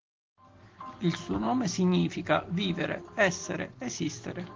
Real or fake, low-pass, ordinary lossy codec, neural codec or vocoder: real; 7.2 kHz; Opus, 16 kbps; none